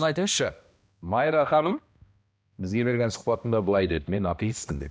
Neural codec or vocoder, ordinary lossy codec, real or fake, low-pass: codec, 16 kHz, 1 kbps, X-Codec, HuBERT features, trained on balanced general audio; none; fake; none